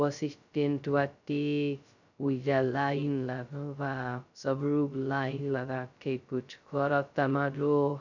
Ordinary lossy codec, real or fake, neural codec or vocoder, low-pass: none; fake; codec, 16 kHz, 0.2 kbps, FocalCodec; 7.2 kHz